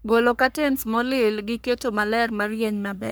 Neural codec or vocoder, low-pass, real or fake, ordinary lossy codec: codec, 44.1 kHz, 3.4 kbps, Pupu-Codec; none; fake; none